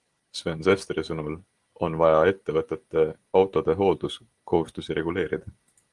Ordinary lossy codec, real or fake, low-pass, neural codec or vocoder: Opus, 24 kbps; real; 10.8 kHz; none